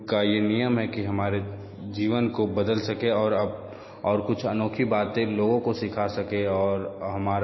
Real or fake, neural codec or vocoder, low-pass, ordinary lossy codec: real; none; 7.2 kHz; MP3, 24 kbps